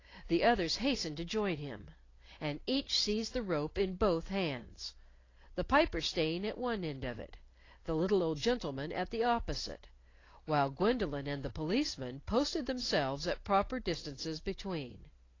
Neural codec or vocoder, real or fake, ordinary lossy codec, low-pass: none; real; AAC, 32 kbps; 7.2 kHz